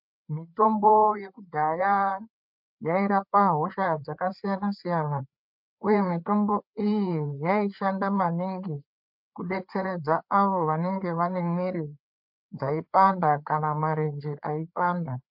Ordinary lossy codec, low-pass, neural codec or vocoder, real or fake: MP3, 48 kbps; 5.4 kHz; codec, 16 kHz, 4 kbps, FreqCodec, larger model; fake